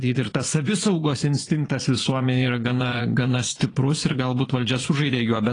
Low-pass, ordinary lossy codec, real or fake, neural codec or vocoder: 9.9 kHz; AAC, 32 kbps; fake; vocoder, 22.05 kHz, 80 mel bands, Vocos